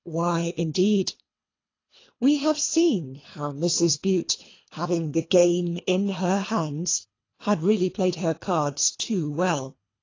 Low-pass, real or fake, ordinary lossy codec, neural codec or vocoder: 7.2 kHz; fake; AAC, 32 kbps; codec, 24 kHz, 3 kbps, HILCodec